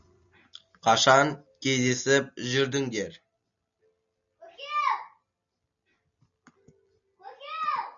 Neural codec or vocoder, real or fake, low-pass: none; real; 7.2 kHz